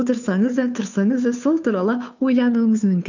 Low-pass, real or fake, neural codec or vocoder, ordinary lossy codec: 7.2 kHz; fake; codec, 16 kHz, 2 kbps, FunCodec, trained on Chinese and English, 25 frames a second; none